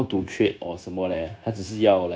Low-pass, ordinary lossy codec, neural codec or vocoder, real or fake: none; none; codec, 16 kHz, 0.9 kbps, LongCat-Audio-Codec; fake